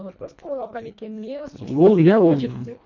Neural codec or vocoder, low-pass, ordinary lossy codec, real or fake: codec, 24 kHz, 1.5 kbps, HILCodec; 7.2 kHz; none; fake